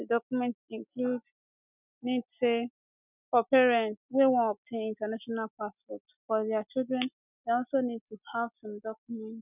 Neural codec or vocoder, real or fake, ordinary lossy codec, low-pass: none; real; none; 3.6 kHz